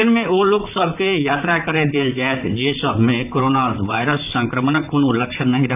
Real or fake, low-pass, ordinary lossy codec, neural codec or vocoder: fake; 3.6 kHz; none; vocoder, 44.1 kHz, 128 mel bands, Pupu-Vocoder